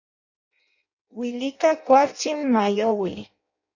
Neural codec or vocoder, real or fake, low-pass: codec, 16 kHz in and 24 kHz out, 0.6 kbps, FireRedTTS-2 codec; fake; 7.2 kHz